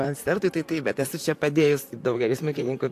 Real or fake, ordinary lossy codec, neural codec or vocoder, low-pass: fake; AAC, 64 kbps; vocoder, 44.1 kHz, 128 mel bands, Pupu-Vocoder; 14.4 kHz